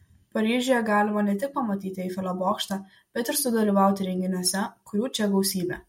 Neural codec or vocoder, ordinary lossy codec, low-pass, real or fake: none; MP3, 64 kbps; 19.8 kHz; real